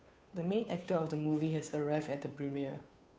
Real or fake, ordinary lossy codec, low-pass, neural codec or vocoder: fake; none; none; codec, 16 kHz, 2 kbps, FunCodec, trained on Chinese and English, 25 frames a second